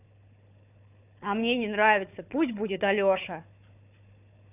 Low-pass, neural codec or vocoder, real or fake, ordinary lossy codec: 3.6 kHz; codec, 16 kHz, 4 kbps, FunCodec, trained on Chinese and English, 50 frames a second; fake; none